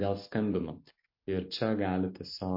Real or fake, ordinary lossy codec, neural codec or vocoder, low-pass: real; MP3, 32 kbps; none; 5.4 kHz